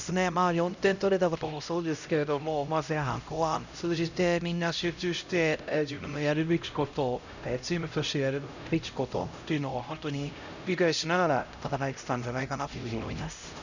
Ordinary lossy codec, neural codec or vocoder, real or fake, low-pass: none; codec, 16 kHz, 0.5 kbps, X-Codec, HuBERT features, trained on LibriSpeech; fake; 7.2 kHz